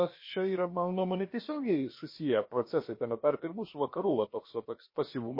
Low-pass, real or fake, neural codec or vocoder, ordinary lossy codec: 5.4 kHz; fake; codec, 16 kHz, about 1 kbps, DyCAST, with the encoder's durations; MP3, 24 kbps